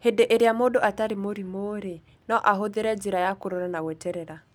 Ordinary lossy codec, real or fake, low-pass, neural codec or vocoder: none; real; 19.8 kHz; none